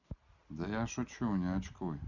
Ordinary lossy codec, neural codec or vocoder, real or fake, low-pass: none; none; real; 7.2 kHz